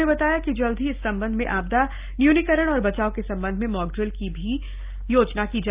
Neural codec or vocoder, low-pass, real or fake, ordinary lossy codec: none; 3.6 kHz; real; Opus, 32 kbps